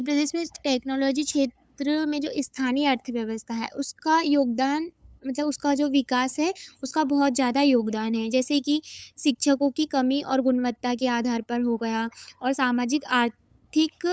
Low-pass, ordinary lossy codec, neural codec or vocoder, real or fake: none; none; codec, 16 kHz, 8 kbps, FunCodec, trained on LibriTTS, 25 frames a second; fake